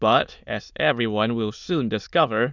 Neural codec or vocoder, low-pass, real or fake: autoencoder, 22.05 kHz, a latent of 192 numbers a frame, VITS, trained on many speakers; 7.2 kHz; fake